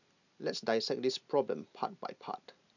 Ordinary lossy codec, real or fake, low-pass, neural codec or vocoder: none; real; 7.2 kHz; none